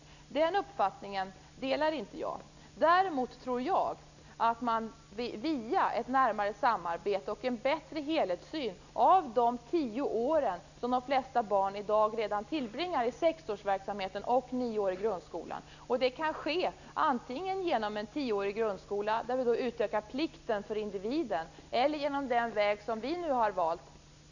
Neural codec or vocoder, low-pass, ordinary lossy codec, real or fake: none; 7.2 kHz; none; real